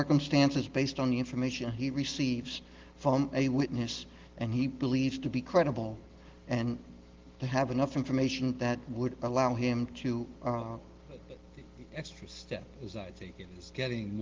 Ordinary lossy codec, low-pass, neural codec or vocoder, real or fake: Opus, 16 kbps; 7.2 kHz; none; real